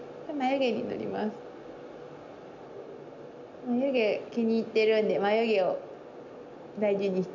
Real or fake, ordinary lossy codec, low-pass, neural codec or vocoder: real; none; 7.2 kHz; none